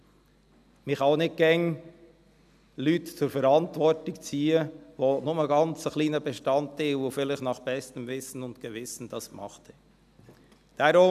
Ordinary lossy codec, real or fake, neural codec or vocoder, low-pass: none; real; none; 14.4 kHz